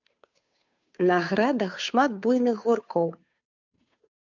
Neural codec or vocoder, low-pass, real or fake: codec, 16 kHz, 2 kbps, FunCodec, trained on Chinese and English, 25 frames a second; 7.2 kHz; fake